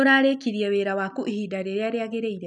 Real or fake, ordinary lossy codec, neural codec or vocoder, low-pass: real; none; none; 10.8 kHz